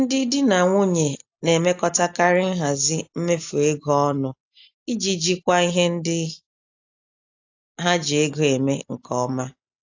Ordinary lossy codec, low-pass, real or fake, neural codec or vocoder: AAC, 48 kbps; 7.2 kHz; real; none